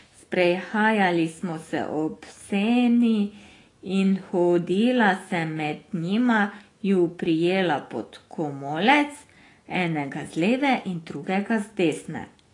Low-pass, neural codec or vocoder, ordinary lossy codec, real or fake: 10.8 kHz; autoencoder, 48 kHz, 128 numbers a frame, DAC-VAE, trained on Japanese speech; AAC, 32 kbps; fake